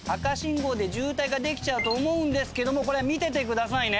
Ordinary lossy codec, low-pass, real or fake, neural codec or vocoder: none; none; real; none